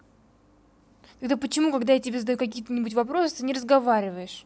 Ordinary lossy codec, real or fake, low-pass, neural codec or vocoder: none; real; none; none